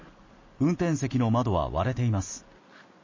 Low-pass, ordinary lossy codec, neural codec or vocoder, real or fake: 7.2 kHz; MP3, 32 kbps; none; real